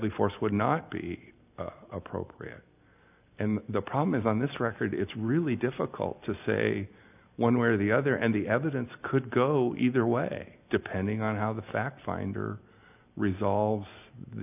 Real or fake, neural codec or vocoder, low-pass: real; none; 3.6 kHz